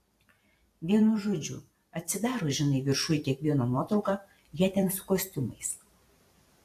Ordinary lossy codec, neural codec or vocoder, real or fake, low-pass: AAC, 64 kbps; vocoder, 44.1 kHz, 128 mel bands every 256 samples, BigVGAN v2; fake; 14.4 kHz